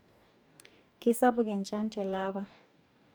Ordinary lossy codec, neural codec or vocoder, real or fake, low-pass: none; codec, 44.1 kHz, 2.6 kbps, DAC; fake; none